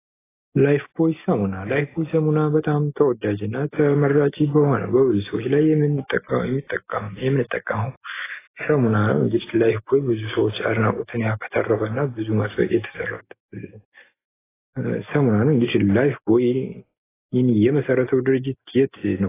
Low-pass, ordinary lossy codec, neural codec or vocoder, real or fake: 3.6 kHz; AAC, 16 kbps; none; real